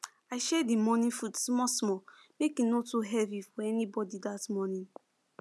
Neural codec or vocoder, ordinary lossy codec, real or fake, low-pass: none; none; real; none